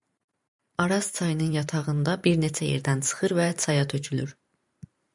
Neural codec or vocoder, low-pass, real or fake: vocoder, 44.1 kHz, 128 mel bands every 512 samples, BigVGAN v2; 10.8 kHz; fake